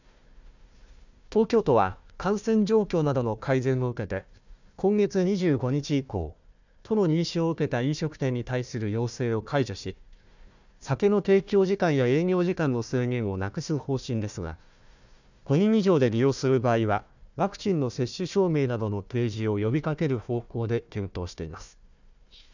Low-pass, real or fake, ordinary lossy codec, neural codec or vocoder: 7.2 kHz; fake; none; codec, 16 kHz, 1 kbps, FunCodec, trained on Chinese and English, 50 frames a second